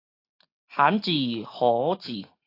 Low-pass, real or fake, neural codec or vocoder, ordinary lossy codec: 5.4 kHz; real; none; AAC, 32 kbps